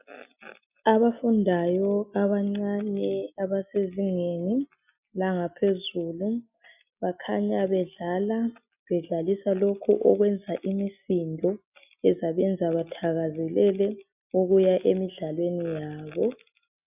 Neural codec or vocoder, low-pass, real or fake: none; 3.6 kHz; real